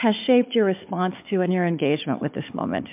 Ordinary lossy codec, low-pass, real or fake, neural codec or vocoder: MP3, 32 kbps; 3.6 kHz; fake; codec, 16 kHz, 4 kbps, FunCodec, trained on Chinese and English, 50 frames a second